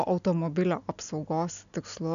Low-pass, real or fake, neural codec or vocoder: 7.2 kHz; real; none